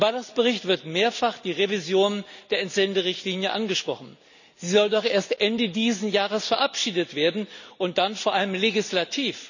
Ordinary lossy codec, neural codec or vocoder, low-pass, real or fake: none; none; 7.2 kHz; real